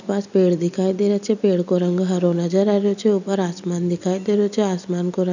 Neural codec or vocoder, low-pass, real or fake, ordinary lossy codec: none; 7.2 kHz; real; none